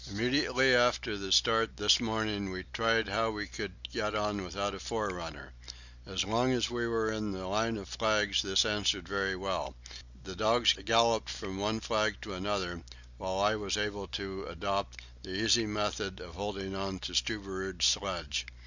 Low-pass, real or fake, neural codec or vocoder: 7.2 kHz; real; none